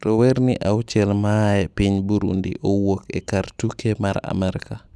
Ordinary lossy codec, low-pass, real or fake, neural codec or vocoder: none; none; real; none